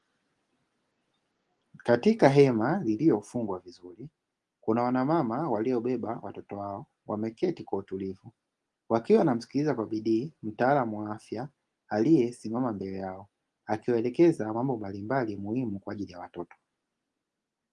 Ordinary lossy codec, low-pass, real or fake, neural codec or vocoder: Opus, 24 kbps; 10.8 kHz; real; none